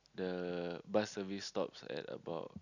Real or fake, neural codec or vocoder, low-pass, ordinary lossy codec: real; none; 7.2 kHz; none